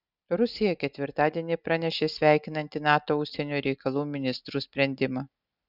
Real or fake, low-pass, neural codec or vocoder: real; 5.4 kHz; none